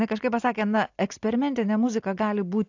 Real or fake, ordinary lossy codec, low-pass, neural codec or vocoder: real; AAC, 48 kbps; 7.2 kHz; none